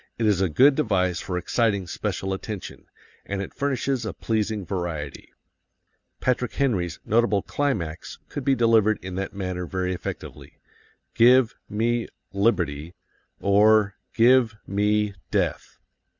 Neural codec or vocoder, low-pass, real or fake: none; 7.2 kHz; real